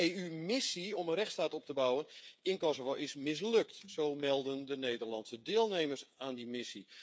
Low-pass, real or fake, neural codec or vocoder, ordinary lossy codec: none; fake; codec, 16 kHz, 8 kbps, FreqCodec, smaller model; none